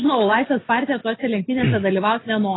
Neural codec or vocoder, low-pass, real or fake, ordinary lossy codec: none; 7.2 kHz; real; AAC, 16 kbps